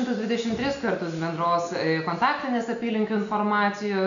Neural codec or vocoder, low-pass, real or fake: none; 7.2 kHz; real